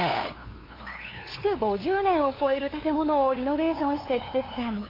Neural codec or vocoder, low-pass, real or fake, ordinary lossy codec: codec, 16 kHz, 2 kbps, FunCodec, trained on LibriTTS, 25 frames a second; 5.4 kHz; fake; AAC, 24 kbps